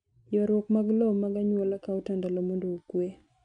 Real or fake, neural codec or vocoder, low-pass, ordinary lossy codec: real; none; 9.9 kHz; MP3, 64 kbps